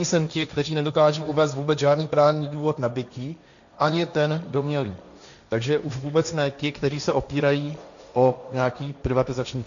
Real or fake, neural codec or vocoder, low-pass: fake; codec, 16 kHz, 1.1 kbps, Voila-Tokenizer; 7.2 kHz